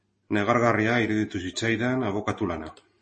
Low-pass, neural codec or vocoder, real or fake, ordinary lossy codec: 10.8 kHz; none; real; MP3, 32 kbps